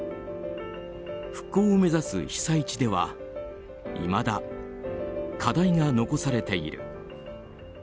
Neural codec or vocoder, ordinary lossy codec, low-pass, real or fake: none; none; none; real